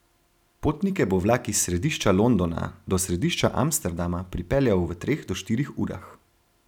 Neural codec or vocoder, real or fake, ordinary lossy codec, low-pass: none; real; none; 19.8 kHz